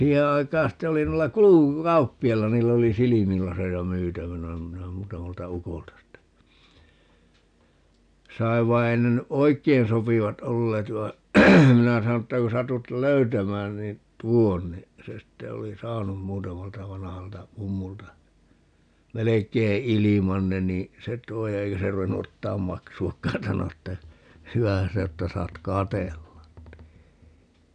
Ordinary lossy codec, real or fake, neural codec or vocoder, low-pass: none; real; none; 9.9 kHz